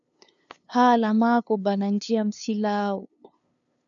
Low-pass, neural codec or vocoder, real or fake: 7.2 kHz; codec, 16 kHz, 2 kbps, FunCodec, trained on LibriTTS, 25 frames a second; fake